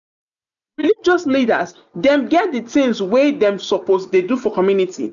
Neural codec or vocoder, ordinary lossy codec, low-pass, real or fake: none; none; 7.2 kHz; real